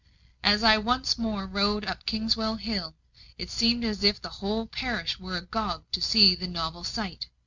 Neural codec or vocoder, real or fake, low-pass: none; real; 7.2 kHz